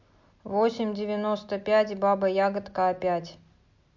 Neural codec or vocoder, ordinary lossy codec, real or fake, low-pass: none; MP3, 48 kbps; real; 7.2 kHz